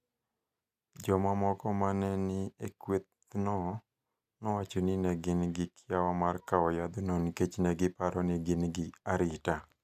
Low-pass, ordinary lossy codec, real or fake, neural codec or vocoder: 14.4 kHz; none; real; none